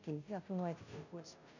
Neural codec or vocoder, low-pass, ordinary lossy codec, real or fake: codec, 16 kHz, 0.5 kbps, FunCodec, trained on Chinese and English, 25 frames a second; 7.2 kHz; none; fake